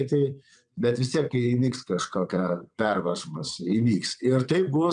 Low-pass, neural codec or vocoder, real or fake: 9.9 kHz; vocoder, 22.05 kHz, 80 mel bands, WaveNeXt; fake